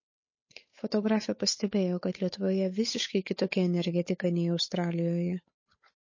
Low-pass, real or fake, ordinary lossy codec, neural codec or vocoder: 7.2 kHz; fake; MP3, 32 kbps; codec, 16 kHz, 8 kbps, FunCodec, trained on Chinese and English, 25 frames a second